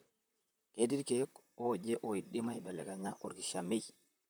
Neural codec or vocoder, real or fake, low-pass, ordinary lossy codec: vocoder, 44.1 kHz, 128 mel bands, Pupu-Vocoder; fake; none; none